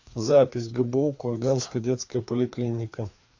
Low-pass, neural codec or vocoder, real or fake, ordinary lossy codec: 7.2 kHz; codec, 16 kHz, 2 kbps, FreqCodec, larger model; fake; AAC, 48 kbps